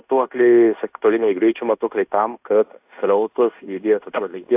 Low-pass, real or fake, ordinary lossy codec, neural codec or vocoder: 3.6 kHz; fake; AAC, 32 kbps; codec, 16 kHz in and 24 kHz out, 0.9 kbps, LongCat-Audio-Codec, fine tuned four codebook decoder